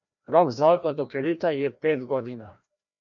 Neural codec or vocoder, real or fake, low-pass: codec, 16 kHz, 1 kbps, FreqCodec, larger model; fake; 7.2 kHz